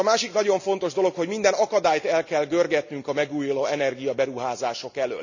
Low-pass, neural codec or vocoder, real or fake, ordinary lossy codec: 7.2 kHz; none; real; none